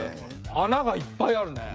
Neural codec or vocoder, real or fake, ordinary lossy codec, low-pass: codec, 16 kHz, 16 kbps, FreqCodec, smaller model; fake; none; none